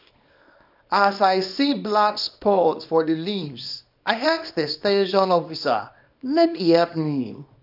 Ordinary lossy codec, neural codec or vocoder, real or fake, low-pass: AAC, 48 kbps; codec, 24 kHz, 0.9 kbps, WavTokenizer, small release; fake; 5.4 kHz